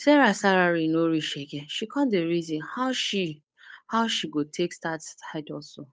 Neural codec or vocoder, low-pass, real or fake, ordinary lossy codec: codec, 16 kHz, 8 kbps, FunCodec, trained on Chinese and English, 25 frames a second; none; fake; none